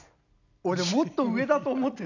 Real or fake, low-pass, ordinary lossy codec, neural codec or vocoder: real; 7.2 kHz; none; none